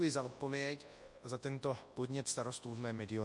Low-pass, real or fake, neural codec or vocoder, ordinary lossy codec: 10.8 kHz; fake; codec, 24 kHz, 0.9 kbps, WavTokenizer, large speech release; MP3, 48 kbps